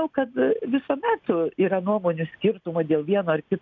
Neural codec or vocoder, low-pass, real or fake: none; 7.2 kHz; real